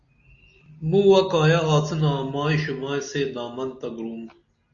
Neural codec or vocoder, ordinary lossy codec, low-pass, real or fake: none; Opus, 64 kbps; 7.2 kHz; real